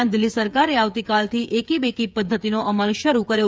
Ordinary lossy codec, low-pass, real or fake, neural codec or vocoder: none; none; fake; codec, 16 kHz, 8 kbps, FreqCodec, smaller model